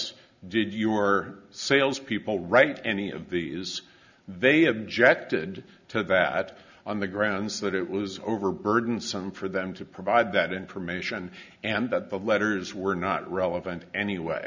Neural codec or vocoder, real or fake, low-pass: none; real; 7.2 kHz